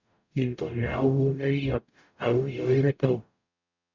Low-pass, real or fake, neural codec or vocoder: 7.2 kHz; fake; codec, 44.1 kHz, 0.9 kbps, DAC